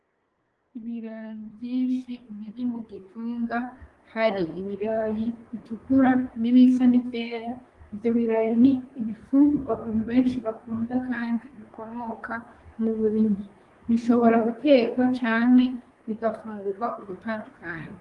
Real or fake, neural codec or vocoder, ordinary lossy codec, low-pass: fake; codec, 24 kHz, 1 kbps, SNAC; Opus, 24 kbps; 10.8 kHz